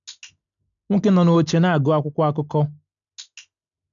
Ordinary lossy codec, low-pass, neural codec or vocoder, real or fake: MP3, 96 kbps; 7.2 kHz; none; real